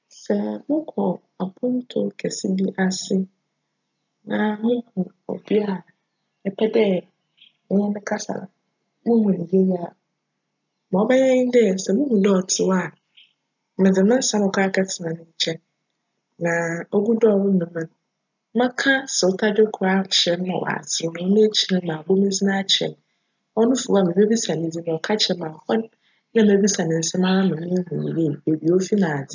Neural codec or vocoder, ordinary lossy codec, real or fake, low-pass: none; none; real; 7.2 kHz